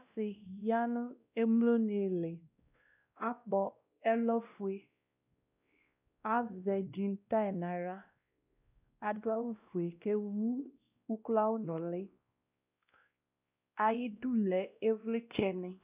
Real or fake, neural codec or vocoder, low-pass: fake; codec, 16 kHz, 1 kbps, X-Codec, WavLM features, trained on Multilingual LibriSpeech; 3.6 kHz